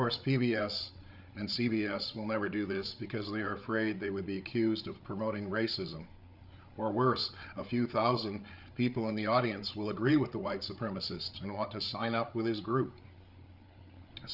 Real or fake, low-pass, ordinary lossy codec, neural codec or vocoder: fake; 5.4 kHz; Opus, 64 kbps; codec, 16 kHz, 8 kbps, FreqCodec, larger model